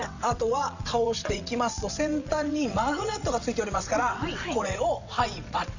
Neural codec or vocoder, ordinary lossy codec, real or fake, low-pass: vocoder, 22.05 kHz, 80 mel bands, WaveNeXt; none; fake; 7.2 kHz